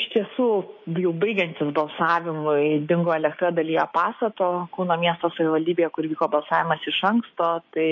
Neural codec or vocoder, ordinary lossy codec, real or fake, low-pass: codec, 16 kHz, 6 kbps, DAC; MP3, 32 kbps; fake; 7.2 kHz